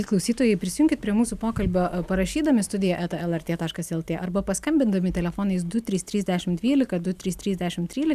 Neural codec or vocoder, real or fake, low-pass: none; real; 14.4 kHz